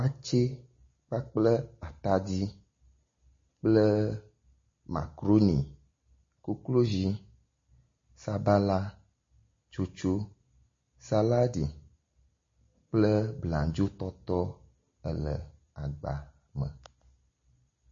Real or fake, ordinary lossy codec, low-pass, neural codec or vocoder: real; MP3, 32 kbps; 7.2 kHz; none